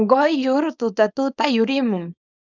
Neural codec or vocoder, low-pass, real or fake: codec, 24 kHz, 0.9 kbps, WavTokenizer, small release; 7.2 kHz; fake